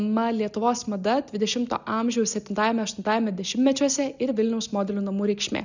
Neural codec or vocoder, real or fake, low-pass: none; real; 7.2 kHz